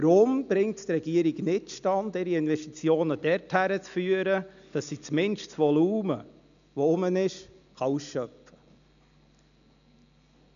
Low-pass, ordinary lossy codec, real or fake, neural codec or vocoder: 7.2 kHz; none; real; none